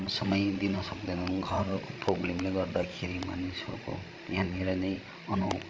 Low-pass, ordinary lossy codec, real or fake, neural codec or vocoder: none; none; fake; codec, 16 kHz, 16 kbps, FreqCodec, larger model